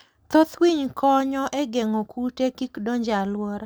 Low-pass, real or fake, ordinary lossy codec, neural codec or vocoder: none; real; none; none